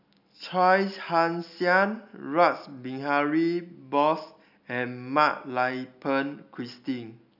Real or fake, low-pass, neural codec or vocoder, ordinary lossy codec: real; 5.4 kHz; none; none